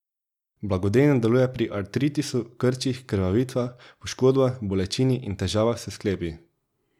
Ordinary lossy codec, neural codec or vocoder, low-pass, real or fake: none; none; 19.8 kHz; real